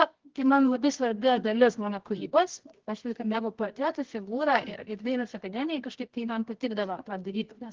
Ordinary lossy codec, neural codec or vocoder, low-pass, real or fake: Opus, 16 kbps; codec, 24 kHz, 0.9 kbps, WavTokenizer, medium music audio release; 7.2 kHz; fake